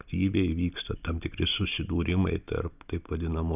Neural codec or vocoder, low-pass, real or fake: none; 3.6 kHz; real